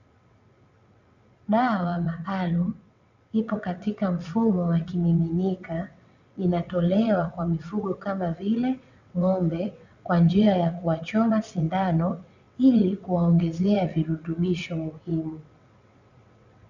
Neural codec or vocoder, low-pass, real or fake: vocoder, 22.05 kHz, 80 mel bands, WaveNeXt; 7.2 kHz; fake